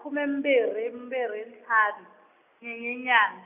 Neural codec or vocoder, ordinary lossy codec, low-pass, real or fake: none; none; 3.6 kHz; real